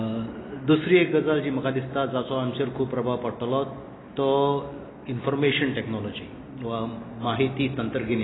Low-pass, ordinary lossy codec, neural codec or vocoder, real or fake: 7.2 kHz; AAC, 16 kbps; none; real